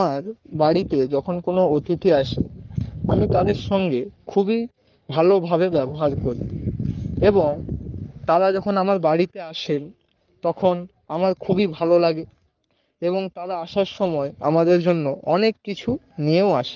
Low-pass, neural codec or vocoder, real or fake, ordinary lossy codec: 7.2 kHz; codec, 44.1 kHz, 3.4 kbps, Pupu-Codec; fake; Opus, 24 kbps